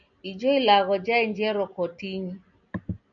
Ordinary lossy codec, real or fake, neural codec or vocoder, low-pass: MP3, 96 kbps; real; none; 7.2 kHz